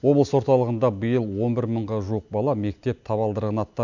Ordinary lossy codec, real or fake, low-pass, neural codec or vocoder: none; real; 7.2 kHz; none